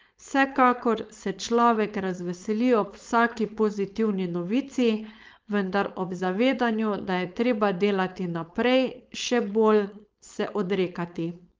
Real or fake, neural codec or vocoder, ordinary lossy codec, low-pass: fake; codec, 16 kHz, 4.8 kbps, FACodec; Opus, 24 kbps; 7.2 kHz